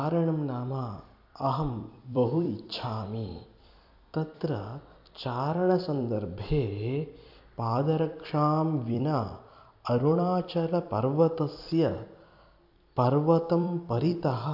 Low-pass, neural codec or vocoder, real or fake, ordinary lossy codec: 5.4 kHz; vocoder, 44.1 kHz, 128 mel bands every 256 samples, BigVGAN v2; fake; AAC, 48 kbps